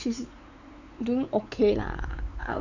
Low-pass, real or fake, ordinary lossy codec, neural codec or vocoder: 7.2 kHz; fake; none; codec, 16 kHz, 4 kbps, X-Codec, WavLM features, trained on Multilingual LibriSpeech